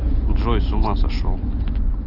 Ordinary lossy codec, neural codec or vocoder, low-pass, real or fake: Opus, 24 kbps; none; 5.4 kHz; real